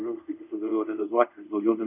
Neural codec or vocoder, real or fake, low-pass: codec, 24 kHz, 0.5 kbps, DualCodec; fake; 3.6 kHz